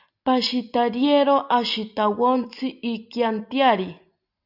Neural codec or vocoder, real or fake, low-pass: none; real; 5.4 kHz